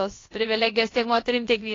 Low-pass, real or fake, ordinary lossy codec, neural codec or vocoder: 7.2 kHz; fake; AAC, 32 kbps; codec, 16 kHz, about 1 kbps, DyCAST, with the encoder's durations